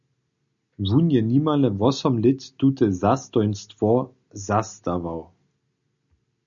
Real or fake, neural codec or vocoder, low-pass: real; none; 7.2 kHz